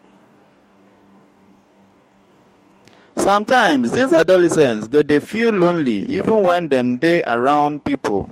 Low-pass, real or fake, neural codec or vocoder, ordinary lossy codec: 19.8 kHz; fake; codec, 44.1 kHz, 2.6 kbps, DAC; MP3, 64 kbps